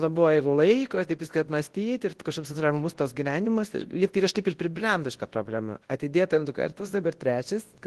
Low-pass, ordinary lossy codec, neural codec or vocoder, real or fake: 10.8 kHz; Opus, 16 kbps; codec, 24 kHz, 0.9 kbps, WavTokenizer, large speech release; fake